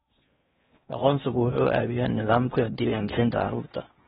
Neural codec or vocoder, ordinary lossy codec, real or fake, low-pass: codec, 16 kHz in and 24 kHz out, 0.8 kbps, FocalCodec, streaming, 65536 codes; AAC, 16 kbps; fake; 10.8 kHz